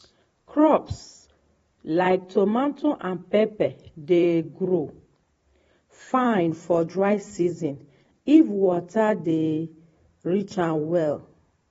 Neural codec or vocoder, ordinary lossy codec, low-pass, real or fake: vocoder, 44.1 kHz, 128 mel bands every 512 samples, BigVGAN v2; AAC, 24 kbps; 19.8 kHz; fake